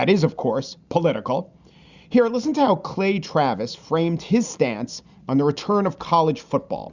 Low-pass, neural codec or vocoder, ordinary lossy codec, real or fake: 7.2 kHz; none; Opus, 64 kbps; real